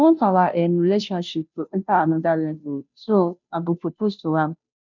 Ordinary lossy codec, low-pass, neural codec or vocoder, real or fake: none; 7.2 kHz; codec, 16 kHz, 0.5 kbps, FunCodec, trained on Chinese and English, 25 frames a second; fake